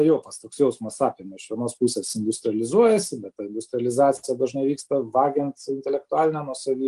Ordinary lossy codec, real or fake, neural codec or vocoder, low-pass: Opus, 24 kbps; real; none; 10.8 kHz